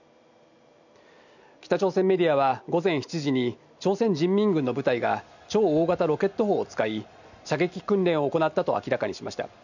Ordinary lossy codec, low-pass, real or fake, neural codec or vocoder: none; 7.2 kHz; real; none